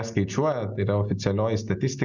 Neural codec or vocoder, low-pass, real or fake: none; 7.2 kHz; real